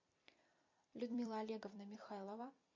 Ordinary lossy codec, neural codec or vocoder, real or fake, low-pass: MP3, 48 kbps; vocoder, 44.1 kHz, 128 mel bands every 256 samples, BigVGAN v2; fake; 7.2 kHz